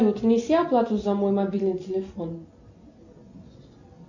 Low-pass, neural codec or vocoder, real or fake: 7.2 kHz; none; real